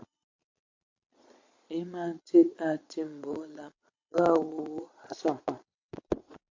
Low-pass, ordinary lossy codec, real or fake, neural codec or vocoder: 7.2 kHz; MP3, 48 kbps; fake; vocoder, 22.05 kHz, 80 mel bands, Vocos